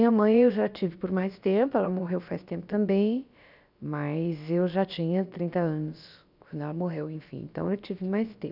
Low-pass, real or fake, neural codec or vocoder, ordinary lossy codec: 5.4 kHz; fake; codec, 16 kHz, about 1 kbps, DyCAST, with the encoder's durations; none